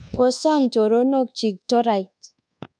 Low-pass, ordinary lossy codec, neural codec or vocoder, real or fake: 9.9 kHz; none; codec, 24 kHz, 1.2 kbps, DualCodec; fake